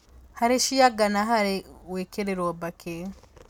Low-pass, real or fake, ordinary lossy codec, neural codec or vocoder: 19.8 kHz; real; none; none